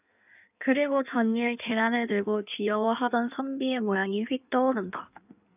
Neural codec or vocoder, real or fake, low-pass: codec, 16 kHz in and 24 kHz out, 1.1 kbps, FireRedTTS-2 codec; fake; 3.6 kHz